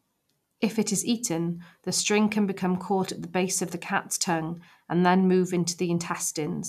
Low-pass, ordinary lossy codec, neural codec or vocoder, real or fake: 14.4 kHz; none; none; real